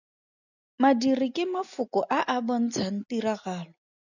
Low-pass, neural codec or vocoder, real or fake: 7.2 kHz; none; real